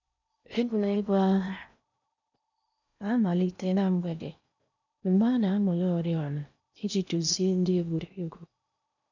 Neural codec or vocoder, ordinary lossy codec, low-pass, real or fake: codec, 16 kHz in and 24 kHz out, 0.6 kbps, FocalCodec, streaming, 4096 codes; none; 7.2 kHz; fake